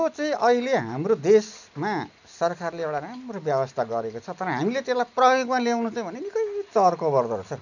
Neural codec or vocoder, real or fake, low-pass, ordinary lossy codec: none; real; 7.2 kHz; none